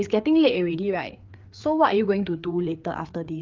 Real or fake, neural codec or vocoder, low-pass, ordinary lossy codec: fake; codec, 16 kHz, 4 kbps, FreqCodec, larger model; 7.2 kHz; Opus, 32 kbps